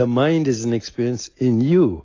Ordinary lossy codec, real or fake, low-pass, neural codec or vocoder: AAC, 32 kbps; real; 7.2 kHz; none